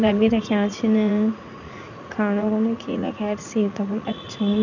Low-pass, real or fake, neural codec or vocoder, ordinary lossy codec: 7.2 kHz; fake; vocoder, 44.1 kHz, 80 mel bands, Vocos; none